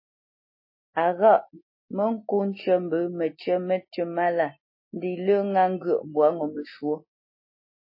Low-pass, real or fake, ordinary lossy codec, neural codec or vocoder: 5.4 kHz; real; MP3, 24 kbps; none